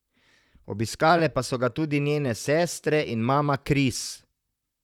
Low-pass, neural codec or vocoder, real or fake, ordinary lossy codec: 19.8 kHz; vocoder, 44.1 kHz, 128 mel bands, Pupu-Vocoder; fake; none